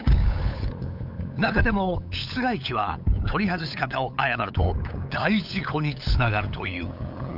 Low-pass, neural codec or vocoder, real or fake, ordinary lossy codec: 5.4 kHz; codec, 16 kHz, 8 kbps, FunCodec, trained on LibriTTS, 25 frames a second; fake; none